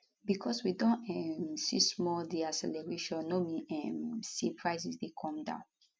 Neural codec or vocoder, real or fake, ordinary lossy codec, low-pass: none; real; none; none